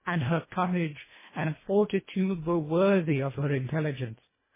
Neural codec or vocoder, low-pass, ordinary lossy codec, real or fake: codec, 24 kHz, 1.5 kbps, HILCodec; 3.6 kHz; MP3, 16 kbps; fake